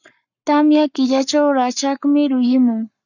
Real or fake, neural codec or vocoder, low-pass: fake; codec, 44.1 kHz, 7.8 kbps, Pupu-Codec; 7.2 kHz